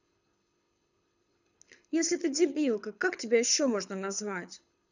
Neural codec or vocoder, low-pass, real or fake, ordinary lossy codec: codec, 24 kHz, 6 kbps, HILCodec; 7.2 kHz; fake; none